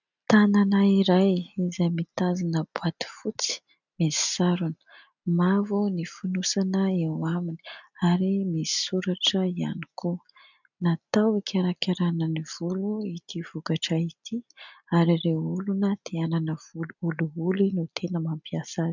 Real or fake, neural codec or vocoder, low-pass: real; none; 7.2 kHz